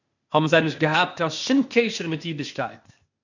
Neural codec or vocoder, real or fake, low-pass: codec, 16 kHz, 0.8 kbps, ZipCodec; fake; 7.2 kHz